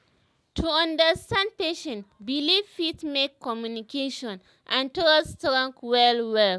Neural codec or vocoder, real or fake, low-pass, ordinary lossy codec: none; real; none; none